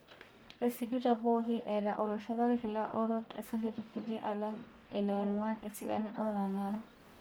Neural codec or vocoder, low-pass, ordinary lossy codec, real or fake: codec, 44.1 kHz, 1.7 kbps, Pupu-Codec; none; none; fake